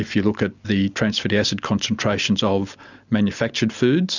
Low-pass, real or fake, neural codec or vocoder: 7.2 kHz; real; none